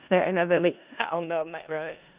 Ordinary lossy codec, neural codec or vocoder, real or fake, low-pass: Opus, 64 kbps; codec, 16 kHz in and 24 kHz out, 0.4 kbps, LongCat-Audio-Codec, four codebook decoder; fake; 3.6 kHz